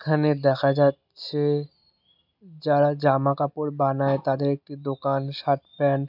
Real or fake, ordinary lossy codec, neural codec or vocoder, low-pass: real; none; none; 5.4 kHz